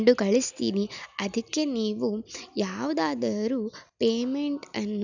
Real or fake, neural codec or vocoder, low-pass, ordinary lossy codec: real; none; 7.2 kHz; none